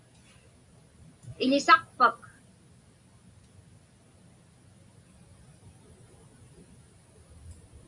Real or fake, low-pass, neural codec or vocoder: real; 10.8 kHz; none